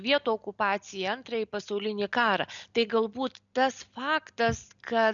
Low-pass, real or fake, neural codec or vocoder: 7.2 kHz; real; none